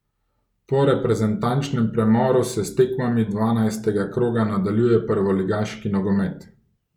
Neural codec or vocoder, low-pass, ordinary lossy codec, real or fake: none; 19.8 kHz; none; real